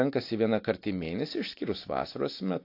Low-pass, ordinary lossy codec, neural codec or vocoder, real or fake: 5.4 kHz; AAC, 32 kbps; none; real